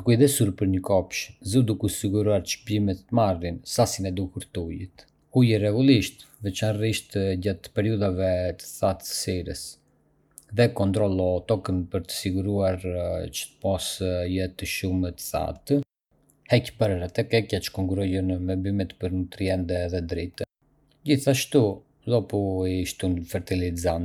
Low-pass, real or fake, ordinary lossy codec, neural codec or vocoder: 19.8 kHz; real; none; none